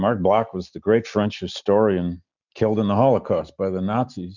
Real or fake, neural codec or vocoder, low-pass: real; none; 7.2 kHz